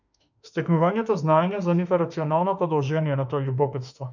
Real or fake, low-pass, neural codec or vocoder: fake; 7.2 kHz; autoencoder, 48 kHz, 32 numbers a frame, DAC-VAE, trained on Japanese speech